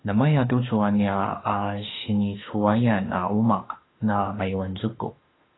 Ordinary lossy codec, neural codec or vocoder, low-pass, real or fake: AAC, 16 kbps; autoencoder, 48 kHz, 32 numbers a frame, DAC-VAE, trained on Japanese speech; 7.2 kHz; fake